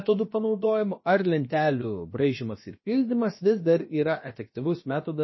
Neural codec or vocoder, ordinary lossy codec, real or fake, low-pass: codec, 16 kHz, about 1 kbps, DyCAST, with the encoder's durations; MP3, 24 kbps; fake; 7.2 kHz